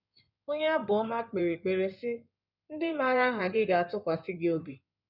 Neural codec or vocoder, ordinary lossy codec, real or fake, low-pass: codec, 16 kHz in and 24 kHz out, 2.2 kbps, FireRedTTS-2 codec; none; fake; 5.4 kHz